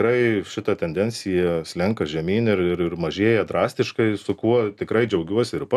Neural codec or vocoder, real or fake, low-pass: none; real; 14.4 kHz